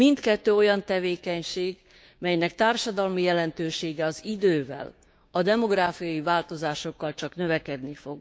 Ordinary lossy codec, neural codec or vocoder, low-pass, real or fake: none; codec, 16 kHz, 6 kbps, DAC; none; fake